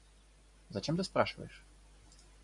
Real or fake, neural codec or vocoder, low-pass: real; none; 10.8 kHz